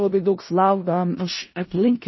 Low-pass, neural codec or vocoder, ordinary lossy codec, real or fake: 7.2 kHz; codec, 16 kHz in and 24 kHz out, 0.4 kbps, LongCat-Audio-Codec, four codebook decoder; MP3, 24 kbps; fake